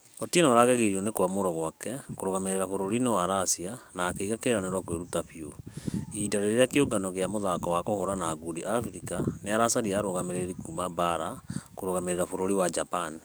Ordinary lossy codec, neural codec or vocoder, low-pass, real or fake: none; codec, 44.1 kHz, 7.8 kbps, DAC; none; fake